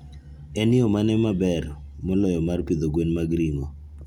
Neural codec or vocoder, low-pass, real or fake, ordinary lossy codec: none; 19.8 kHz; real; none